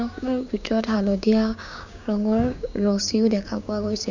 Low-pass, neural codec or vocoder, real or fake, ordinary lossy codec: 7.2 kHz; codec, 16 kHz, 6 kbps, DAC; fake; none